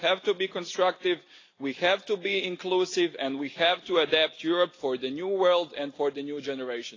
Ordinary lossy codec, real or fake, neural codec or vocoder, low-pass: AAC, 32 kbps; real; none; 7.2 kHz